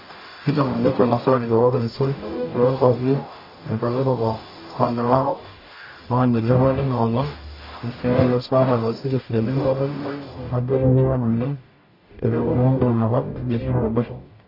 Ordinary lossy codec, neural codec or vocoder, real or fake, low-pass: MP3, 24 kbps; codec, 44.1 kHz, 0.9 kbps, DAC; fake; 5.4 kHz